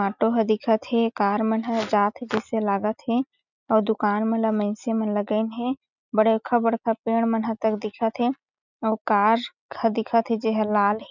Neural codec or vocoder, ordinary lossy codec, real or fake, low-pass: none; none; real; 7.2 kHz